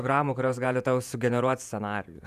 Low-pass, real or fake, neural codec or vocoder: 14.4 kHz; fake; vocoder, 48 kHz, 128 mel bands, Vocos